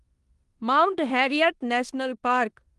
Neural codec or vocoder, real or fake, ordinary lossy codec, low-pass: codec, 16 kHz in and 24 kHz out, 0.9 kbps, LongCat-Audio-Codec, four codebook decoder; fake; Opus, 24 kbps; 10.8 kHz